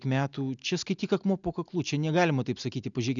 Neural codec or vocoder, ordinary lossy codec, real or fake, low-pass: none; MP3, 96 kbps; real; 7.2 kHz